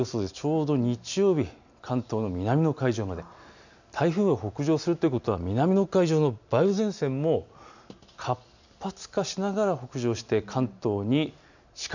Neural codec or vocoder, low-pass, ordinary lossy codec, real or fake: none; 7.2 kHz; none; real